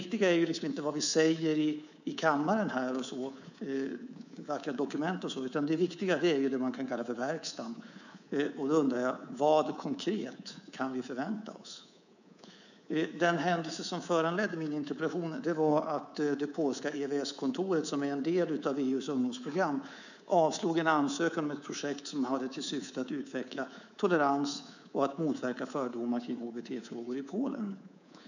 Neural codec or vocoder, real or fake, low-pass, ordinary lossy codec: codec, 24 kHz, 3.1 kbps, DualCodec; fake; 7.2 kHz; none